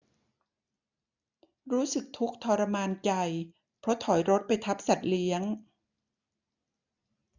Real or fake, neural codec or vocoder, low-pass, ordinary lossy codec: real; none; 7.2 kHz; none